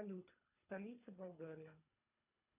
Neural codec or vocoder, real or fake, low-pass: codec, 24 kHz, 3 kbps, HILCodec; fake; 3.6 kHz